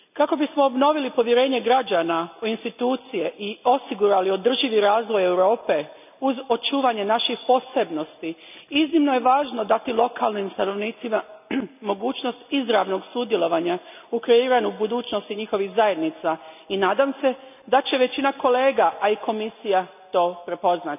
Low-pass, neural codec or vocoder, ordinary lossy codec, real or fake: 3.6 kHz; none; none; real